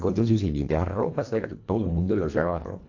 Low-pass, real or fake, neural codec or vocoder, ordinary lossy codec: 7.2 kHz; fake; codec, 24 kHz, 1.5 kbps, HILCodec; AAC, 48 kbps